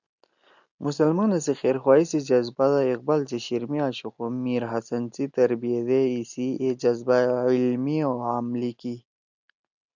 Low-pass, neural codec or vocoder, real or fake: 7.2 kHz; none; real